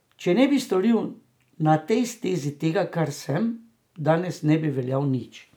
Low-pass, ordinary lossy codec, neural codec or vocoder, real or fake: none; none; none; real